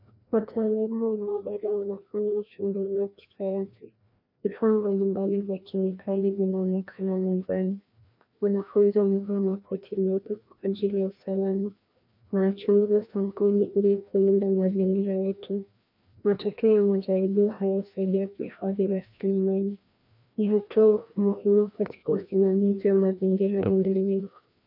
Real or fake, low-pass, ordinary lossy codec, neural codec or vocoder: fake; 5.4 kHz; MP3, 48 kbps; codec, 16 kHz, 1 kbps, FreqCodec, larger model